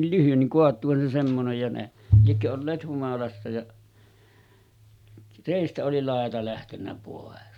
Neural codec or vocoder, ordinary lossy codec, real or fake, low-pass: none; none; real; 19.8 kHz